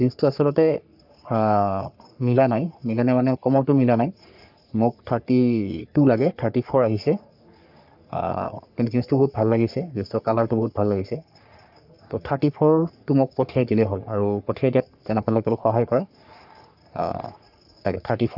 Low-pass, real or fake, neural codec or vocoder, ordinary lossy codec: 5.4 kHz; fake; codec, 44.1 kHz, 3.4 kbps, Pupu-Codec; none